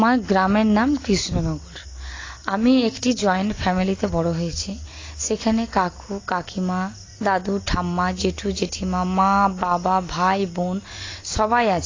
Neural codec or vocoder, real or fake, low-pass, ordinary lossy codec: none; real; 7.2 kHz; AAC, 32 kbps